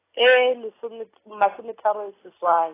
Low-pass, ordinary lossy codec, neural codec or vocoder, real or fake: 3.6 kHz; AAC, 16 kbps; none; real